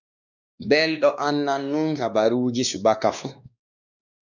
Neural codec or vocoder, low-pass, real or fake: codec, 16 kHz, 2 kbps, X-Codec, WavLM features, trained on Multilingual LibriSpeech; 7.2 kHz; fake